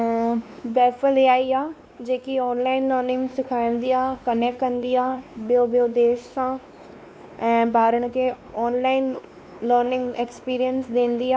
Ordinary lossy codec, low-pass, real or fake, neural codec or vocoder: none; none; fake; codec, 16 kHz, 2 kbps, X-Codec, WavLM features, trained on Multilingual LibriSpeech